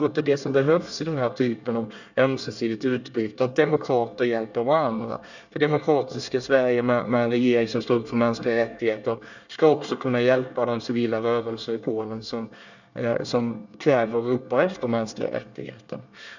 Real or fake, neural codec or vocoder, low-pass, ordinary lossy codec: fake; codec, 24 kHz, 1 kbps, SNAC; 7.2 kHz; none